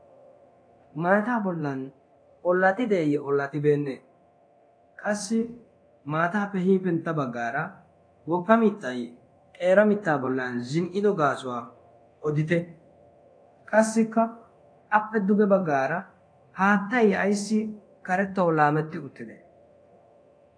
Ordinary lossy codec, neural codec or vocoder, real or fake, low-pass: AAC, 48 kbps; codec, 24 kHz, 0.9 kbps, DualCodec; fake; 9.9 kHz